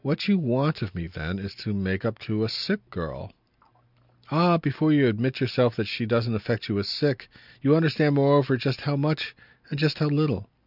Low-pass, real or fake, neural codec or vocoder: 5.4 kHz; real; none